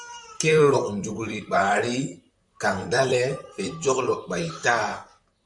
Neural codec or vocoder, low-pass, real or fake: vocoder, 44.1 kHz, 128 mel bands, Pupu-Vocoder; 10.8 kHz; fake